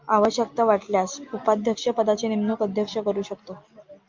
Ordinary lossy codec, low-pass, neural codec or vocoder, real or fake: Opus, 24 kbps; 7.2 kHz; none; real